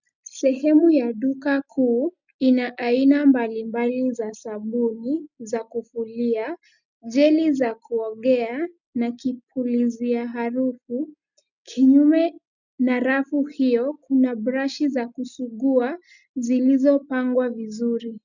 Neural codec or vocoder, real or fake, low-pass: none; real; 7.2 kHz